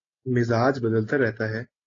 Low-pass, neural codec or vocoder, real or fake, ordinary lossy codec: 7.2 kHz; none; real; AAC, 32 kbps